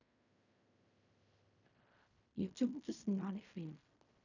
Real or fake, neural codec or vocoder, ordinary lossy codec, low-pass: fake; codec, 16 kHz in and 24 kHz out, 0.4 kbps, LongCat-Audio-Codec, fine tuned four codebook decoder; none; 7.2 kHz